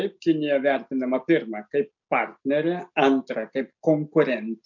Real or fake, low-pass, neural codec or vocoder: real; 7.2 kHz; none